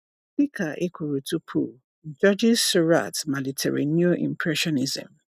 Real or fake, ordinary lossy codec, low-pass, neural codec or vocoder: real; none; 14.4 kHz; none